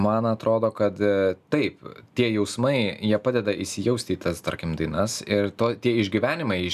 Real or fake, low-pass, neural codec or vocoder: real; 14.4 kHz; none